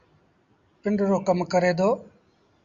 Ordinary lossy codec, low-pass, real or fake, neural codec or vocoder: Opus, 64 kbps; 7.2 kHz; real; none